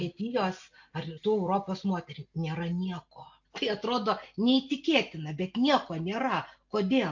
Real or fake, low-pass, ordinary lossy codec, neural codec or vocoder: real; 7.2 kHz; MP3, 48 kbps; none